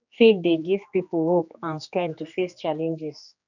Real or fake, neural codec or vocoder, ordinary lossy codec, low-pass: fake; codec, 16 kHz, 2 kbps, X-Codec, HuBERT features, trained on general audio; none; 7.2 kHz